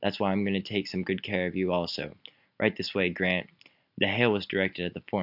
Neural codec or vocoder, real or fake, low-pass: none; real; 5.4 kHz